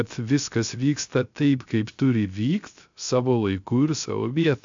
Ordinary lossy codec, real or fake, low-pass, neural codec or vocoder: MP3, 64 kbps; fake; 7.2 kHz; codec, 16 kHz, 0.3 kbps, FocalCodec